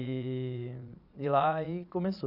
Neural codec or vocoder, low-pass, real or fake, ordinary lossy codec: vocoder, 22.05 kHz, 80 mel bands, Vocos; 5.4 kHz; fake; none